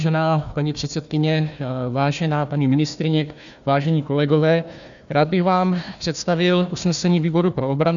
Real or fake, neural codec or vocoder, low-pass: fake; codec, 16 kHz, 1 kbps, FunCodec, trained on Chinese and English, 50 frames a second; 7.2 kHz